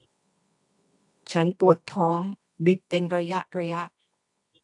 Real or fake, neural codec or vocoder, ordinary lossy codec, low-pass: fake; codec, 24 kHz, 0.9 kbps, WavTokenizer, medium music audio release; AAC, 64 kbps; 10.8 kHz